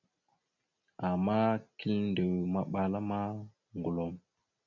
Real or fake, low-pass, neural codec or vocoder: real; 7.2 kHz; none